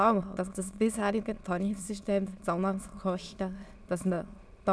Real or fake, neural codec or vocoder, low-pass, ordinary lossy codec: fake; autoencoder, 22.05 kHz, a latent of 192 numbers a frame, VITS, trained on many speakers; none; none